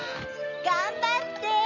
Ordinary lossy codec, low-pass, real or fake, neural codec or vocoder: none; 7.2 kHz; real; none